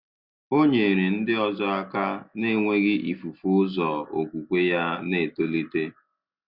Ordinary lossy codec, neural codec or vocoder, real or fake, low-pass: Opus, 64 kbps; none; real; 5.4 kHz